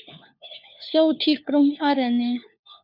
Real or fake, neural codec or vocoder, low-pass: fake; codec, 16 kHz, 4 kbps, FunCodec, trained on LibriTTS, 50 frames a second; 5.4 kHz